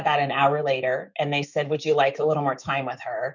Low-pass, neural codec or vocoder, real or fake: 7.2 kHz; autoencoder, 48 kHz, 128 numbers a frame, DAC-VAE, trained on Japanese speech; fake